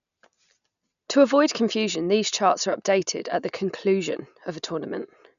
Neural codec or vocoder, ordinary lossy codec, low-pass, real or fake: none; none; 7.2 kHz; real